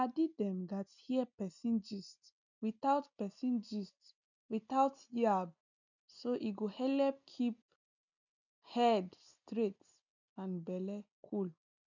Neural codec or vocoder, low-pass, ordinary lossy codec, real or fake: none; 7.2 kHz; none; real